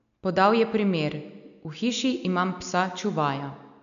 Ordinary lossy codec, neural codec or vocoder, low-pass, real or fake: none; none; 7.2 kHz; real